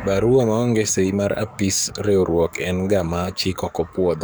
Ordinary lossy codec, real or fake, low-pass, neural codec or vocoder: none; fake; none; codec, 44.1 kHz, 7.8 kbps, DAC